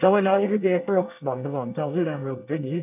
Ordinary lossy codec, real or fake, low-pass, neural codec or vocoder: none; fake; 3.6 kHz; codec, 24 kHz, 1 kbps, SNAC